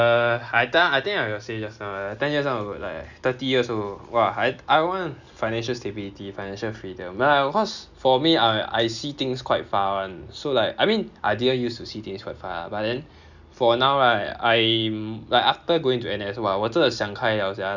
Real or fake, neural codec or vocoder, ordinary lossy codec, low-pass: real; none; none; 7.2 kHz